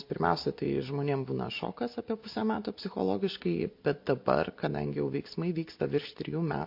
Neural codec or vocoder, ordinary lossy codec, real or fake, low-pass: none; MP3, 32 kbps; real; 5.4 kHz